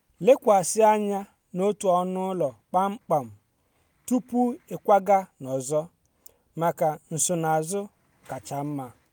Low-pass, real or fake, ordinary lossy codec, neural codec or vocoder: none; real; none; none